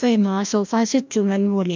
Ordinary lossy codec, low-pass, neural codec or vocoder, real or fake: MP3, 64 kbps; 7.2 kHz; codec, 16 kHz, 1 kbps, FreqCodec, larger model; fake